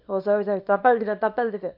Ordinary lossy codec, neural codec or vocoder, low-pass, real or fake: none; codec, 24 kHz, 0.9 kbps, WavTokenizer, small release; 5.4 kHz; fake